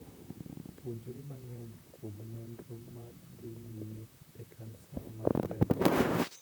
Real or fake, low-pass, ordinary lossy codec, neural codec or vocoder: fake; none; none; vocoder, 44.1 kHz, 128 mel bands, Pupu-Vocoder